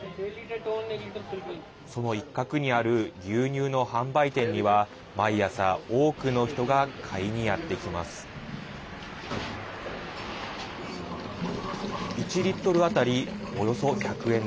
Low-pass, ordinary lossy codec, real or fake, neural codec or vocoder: none; none; real; none